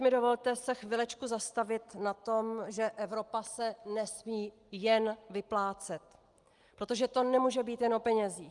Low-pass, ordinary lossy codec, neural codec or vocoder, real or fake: 10.8 kHz; Opus, 32 kbps; none; real